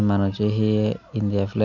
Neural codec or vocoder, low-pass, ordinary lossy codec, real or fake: none; 7.2 kHz; none; real